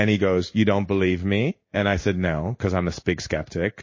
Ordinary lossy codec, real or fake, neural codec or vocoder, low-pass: MP3, 32 kbps; fake; codec, 16 kHz in and 24 kHz out, 1 kbps, XY-Tokenizer; 7.2 kHz